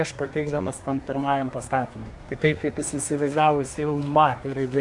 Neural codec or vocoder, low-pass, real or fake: codec, 24 kHz, 1 kbps, SNAC; 10.8 kHz; fake